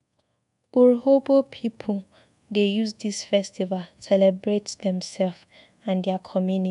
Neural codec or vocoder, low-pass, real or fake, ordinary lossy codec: codec, 24 kHz, 1.2 kbps, DualCodec; 10.8 kHz; fake; none